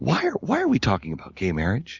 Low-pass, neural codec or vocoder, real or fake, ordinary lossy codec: 7.2 kHz; none; real; Opus, 64 kbps